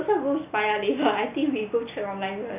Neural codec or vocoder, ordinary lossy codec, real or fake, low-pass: none; none; real; 3.6 kHz